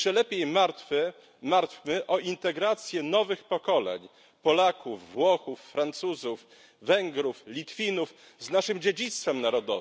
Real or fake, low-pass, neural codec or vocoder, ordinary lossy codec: real; none; none; none